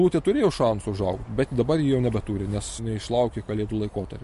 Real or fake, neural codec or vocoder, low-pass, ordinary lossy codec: real; none; 14.4 kHz; MP3, 48 kbps